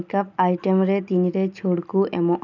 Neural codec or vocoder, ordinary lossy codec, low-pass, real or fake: none; none; 7.2 kHz; real